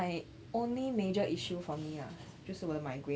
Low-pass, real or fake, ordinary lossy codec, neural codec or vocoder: none; real; none; none